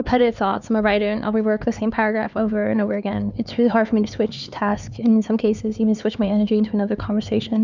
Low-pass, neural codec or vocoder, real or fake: 7.2 kHz; codec, 16 kHz, 4 kbps, X-Codec, HuBERT features, trained on LibriSpeech; fake